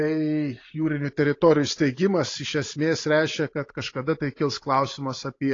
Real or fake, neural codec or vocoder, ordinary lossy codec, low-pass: real; none; AAC, 32 kbps; 7.2 kHz